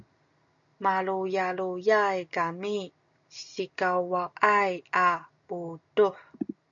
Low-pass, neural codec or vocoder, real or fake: 7.2 kHz; none; real